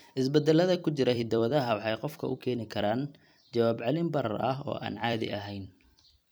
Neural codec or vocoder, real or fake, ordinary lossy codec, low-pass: vocoder, 44.1 kHz, 128 mel bands every 256 samples, BigVGAN v2; fake; none; none